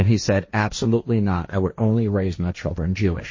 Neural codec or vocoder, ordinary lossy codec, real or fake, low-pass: codec, 16 kHz, 1.1 kbps, Voila-Tokenizer; MP3, 32 kbps; fake; 7.2 kHz